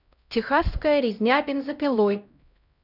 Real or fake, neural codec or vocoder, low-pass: fake; codec, 16 kHz, 0.5 kbps, X-Codec, HuBERT features, trained on LibriSpeech; 5.4 kHz